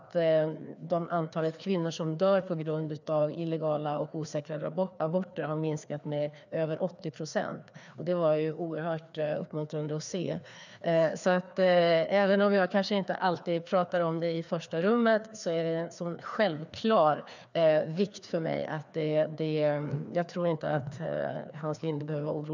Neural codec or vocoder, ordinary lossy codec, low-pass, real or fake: codec, 16 kHz, 2 kbps, FreqCodec, larger model; none; 7.2 kHz; fake